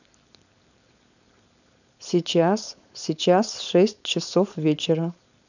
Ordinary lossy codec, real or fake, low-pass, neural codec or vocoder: none; fake; 7.2 kHz; codec, 16 kHz, 4.8 kbps, FACodec